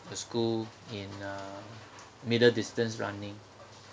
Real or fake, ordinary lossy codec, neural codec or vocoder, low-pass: real; none; none; none